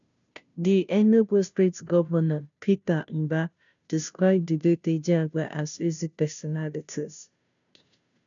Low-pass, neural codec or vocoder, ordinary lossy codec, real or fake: 7.2 kHz; codec, 16 kHz, 0.5 kbps, FunCodec, trained on Chinese and English, 25 frames a second; none; fake